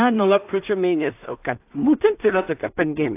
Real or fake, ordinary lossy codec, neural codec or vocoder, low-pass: fake; AAC, 24 kbps; codec, 16 kHz in and 24 kHz out, 0.4 kbps, LongCat-Audio-Codec, two codebook decoder; 3.6 kHz